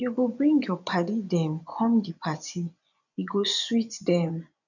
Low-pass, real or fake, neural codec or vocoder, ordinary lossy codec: 7.2 kHz; fake; vocoder, 24 kHz, 100 mel bands, Vocos; none